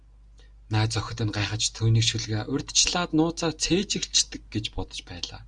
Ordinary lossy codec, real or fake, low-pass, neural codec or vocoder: Opus, 64 kbps; real; 9.9 kHz; none